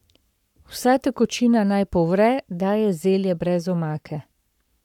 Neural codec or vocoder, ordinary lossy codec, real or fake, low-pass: codec, 44.1 kHz, 7.8 kbps, Pupu-Codec; none; fake; 19.8 kHz